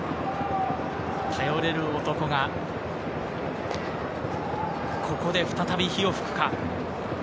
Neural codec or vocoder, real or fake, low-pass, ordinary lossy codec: none; real; none; none